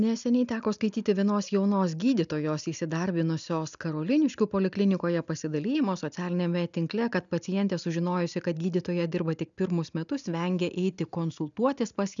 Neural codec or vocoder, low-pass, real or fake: none; 7.2 kHz; real